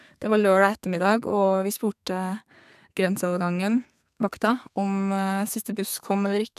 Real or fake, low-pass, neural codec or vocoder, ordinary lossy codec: fake; 14.4 kHz; codec, 32 kHz, 1.9 kbps, SNAC; none